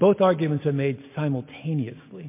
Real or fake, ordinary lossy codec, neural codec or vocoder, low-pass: real; MP3, 24 kbps; none; 3.6 kHz